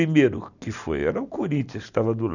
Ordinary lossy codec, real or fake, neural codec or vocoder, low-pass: none; real; none; 7.2 kHz